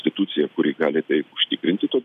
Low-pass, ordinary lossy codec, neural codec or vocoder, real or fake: 14.4 kHz; AAC, 96 kbps; none; real